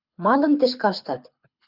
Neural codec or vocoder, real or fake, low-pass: codec, 24 kHz, 6 kbps, HILCodec; fake; 5.4 kHz